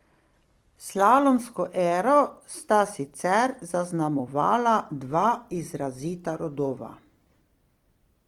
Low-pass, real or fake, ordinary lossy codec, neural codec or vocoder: 19.8 kHz; fake; Opus, 24 kbps; vocoder, 44.1 kHz, 128 mel bands every 256 samples, BigVGAN v2